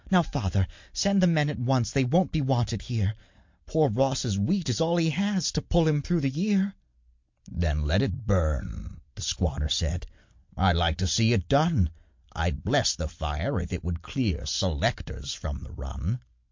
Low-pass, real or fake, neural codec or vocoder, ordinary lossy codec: 7.2 kHz; real; none; MP3, 48 kbps